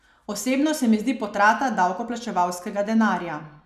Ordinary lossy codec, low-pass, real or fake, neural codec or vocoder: none; 14.4 kHz; real; none